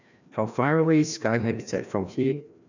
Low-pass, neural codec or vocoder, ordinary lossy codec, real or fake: 7.2 kHz; codec, 16 kHz, 1 kbps, FreqCodec, larger model; none; fake